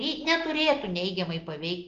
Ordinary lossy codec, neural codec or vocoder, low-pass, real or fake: Opus, 32 kbps; none; 7.2 kHz; real